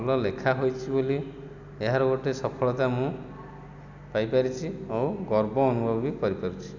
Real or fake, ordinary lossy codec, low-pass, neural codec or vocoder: real; none; 7.2 kHz; none